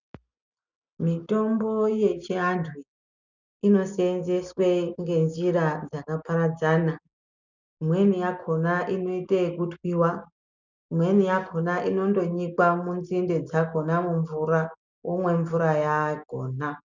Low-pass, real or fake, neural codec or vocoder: 7.2 kHz; real; none